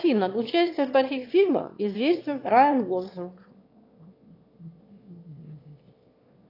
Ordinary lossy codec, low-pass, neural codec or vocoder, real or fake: AAC, 32 kbps; 5.4 kHz; autoencoder, 22.05 kHz, a latent of 192 numbers a frame, VITS, trained on one speaker; fake